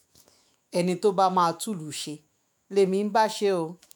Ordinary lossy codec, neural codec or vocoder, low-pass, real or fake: none; autoencoder, 48 kHz, 128 numbers a frame, DAC-VAE, trained on Japanese speech; none; fake